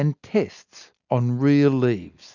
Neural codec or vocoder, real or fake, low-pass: none; real; 7.2 kHz